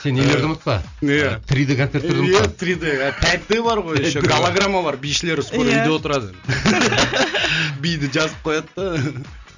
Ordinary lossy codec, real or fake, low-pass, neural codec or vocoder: none; real; 7.2 kHz; none